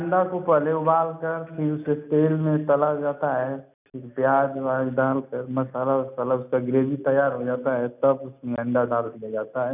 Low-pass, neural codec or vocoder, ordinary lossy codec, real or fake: 3.6 kHz; none; none; real